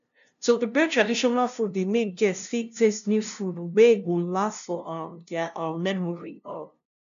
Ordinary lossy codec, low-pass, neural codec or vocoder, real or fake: none; 7.2 kHz; codec, 16 kHz, 0.5 kbps, FunCodec, trained on LibriTTS, 25 frames a second; fake